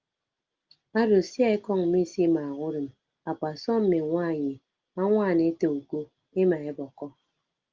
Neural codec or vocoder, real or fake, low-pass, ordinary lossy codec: none; real; 7.2 kHz; Opus, 32 kbps